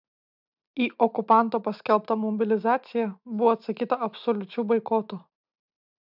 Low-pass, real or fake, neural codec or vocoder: 5.4 kHz; real; none